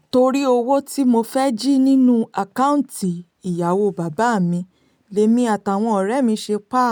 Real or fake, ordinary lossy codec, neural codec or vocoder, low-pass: real; none; none; none